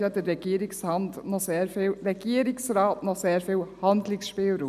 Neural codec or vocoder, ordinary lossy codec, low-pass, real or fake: none; none; 14.4 kHz; real